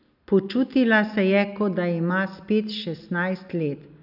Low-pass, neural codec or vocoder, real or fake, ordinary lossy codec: 5.4 kHz; none; real; none